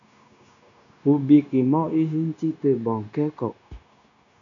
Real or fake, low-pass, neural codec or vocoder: fake; 7.2 kHz; codec, 16 kHz, 0.9 kbps, LongCat-Audio-Codec